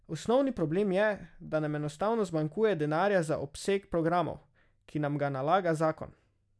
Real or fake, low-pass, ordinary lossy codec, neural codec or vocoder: real; none; none; none